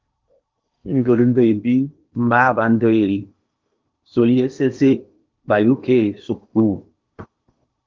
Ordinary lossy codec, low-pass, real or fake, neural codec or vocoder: Opus, 32 kbps; 7.2 kHz; fake; codec, 16 kHz in and 24 kHz out, 0.8 kbps, FocalCodec, streaming, 65536 codes